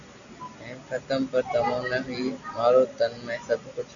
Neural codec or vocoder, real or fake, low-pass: none; real; 7.2 kHz